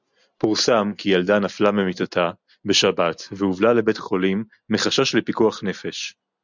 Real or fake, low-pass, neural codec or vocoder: real; 7.2 kHz; none